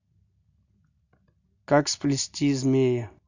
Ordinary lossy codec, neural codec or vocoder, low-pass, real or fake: none; none; 7.2 kHz; real